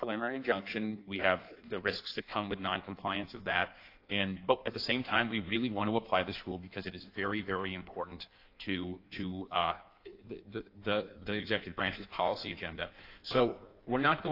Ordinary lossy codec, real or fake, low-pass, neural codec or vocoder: AAC, 32 kbps; fake; 5.4 kHz; codec, 16 kHz in and 24 kHz out, 1.1 kbps, FireRedTTS-2 codec